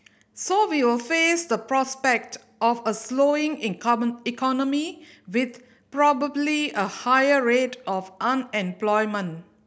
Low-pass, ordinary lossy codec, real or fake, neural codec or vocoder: none; none; real; none